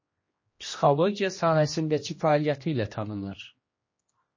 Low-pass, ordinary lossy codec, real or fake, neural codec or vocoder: 7.2 kHz; MP3, 32 kbps; fake; codec, 16 kHz, 1 kbps, X-Codec, HuBERT features, trained on general audio